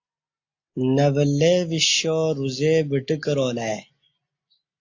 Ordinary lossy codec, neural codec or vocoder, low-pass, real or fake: AAC, 48 kbps; none; 7.2 kHz; real